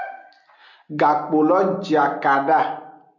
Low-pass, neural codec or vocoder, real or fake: 7.2 kHz; none; real